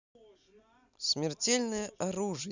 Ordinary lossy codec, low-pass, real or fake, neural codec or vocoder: Opus, 64 kbps; 7.2 kHz; real; none